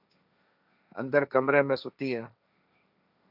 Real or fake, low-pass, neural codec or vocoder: fake; 5.4 kHz; codec, 16 kHz, 1.1 kbps, Voila-Tokenizer